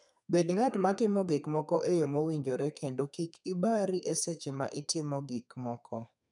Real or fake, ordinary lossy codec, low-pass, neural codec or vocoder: fake; none; 10.8 kHz; codec, 32 kHz, 1.9 kbps, SNAC